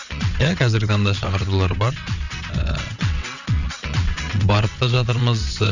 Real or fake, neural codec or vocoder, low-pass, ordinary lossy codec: fake; vocoder, 22.05 kHz, 80 mel bands, WaveNeXt; 7.2 kHz; none